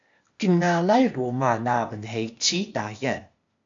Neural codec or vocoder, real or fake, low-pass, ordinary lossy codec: codec, 16 kHz, 0.8 kbps, ZipCodec; fake; 7.2 kHz; AAC, 64 kbps